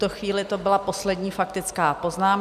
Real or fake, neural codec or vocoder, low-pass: real; none; 14.4 kHz